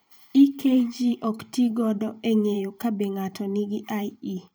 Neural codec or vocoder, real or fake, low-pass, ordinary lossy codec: vocoder, 44.1 kHz, 128 mel bands every 512 samples, BigVGAN v2; fake; none; none